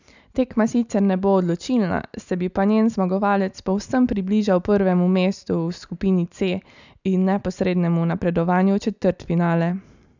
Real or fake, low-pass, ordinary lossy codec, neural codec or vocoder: real; 7.2 kHz; none; none